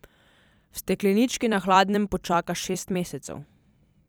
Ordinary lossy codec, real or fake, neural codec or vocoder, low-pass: none; fake; vocoder, 44.1 kHz, 128 mel bands every 256 samples, BigVGAN v2; none